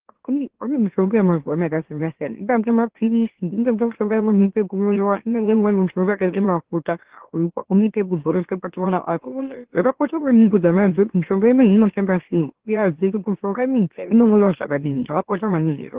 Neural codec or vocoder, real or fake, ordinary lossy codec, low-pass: autoencoder, 44.1 kHz, a latent of 192 numbers a frame, MeloTTS; fake; Opus, 16 kbps; 3.6 kHz